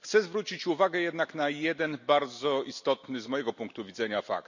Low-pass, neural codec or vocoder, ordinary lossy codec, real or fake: 7.2 kHz; none; none; real